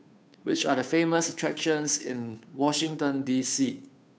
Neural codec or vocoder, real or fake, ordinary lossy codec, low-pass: codec, 16 kHz, 2 kbps, FunCodec, trained on Chinese and English, 25 frames a second; fake; none; none